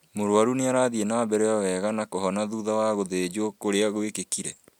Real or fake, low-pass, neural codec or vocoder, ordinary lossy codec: real; 19.8 kHz; none; MP3, 96 kbps